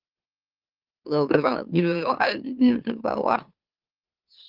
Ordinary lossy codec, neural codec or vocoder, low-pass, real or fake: Opus, 32 kbps; autoencoder, 44.1 kHz, a latent of 192 numbers a frame, MeloTTS; 5.4 kHz; fake